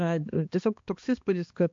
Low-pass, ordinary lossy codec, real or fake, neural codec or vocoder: 7.2 kHz; MP3, 48 kbps; fake; codec, 16 kHz, 2 kbps, X-Codec, HuBERT features, trained on balanced general audio